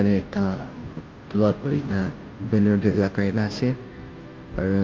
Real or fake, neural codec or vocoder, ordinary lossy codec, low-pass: fake; codec, 16 kHz, 0.5 kbps, FunCodec, trained on Chinese and English, 25 frames a second; Opus, 32 kbps; 7.2 kHz